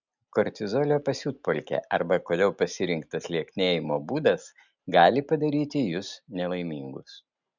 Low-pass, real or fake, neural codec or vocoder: 7.2 kHz; real; none